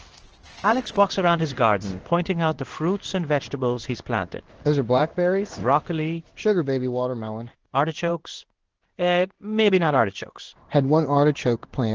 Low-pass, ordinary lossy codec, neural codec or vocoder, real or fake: 7.2 kHz; Opus, 16 kbps; codec, 16 kHz in and 24 kHz out, 1 kbps, XY-Tokenizer; fake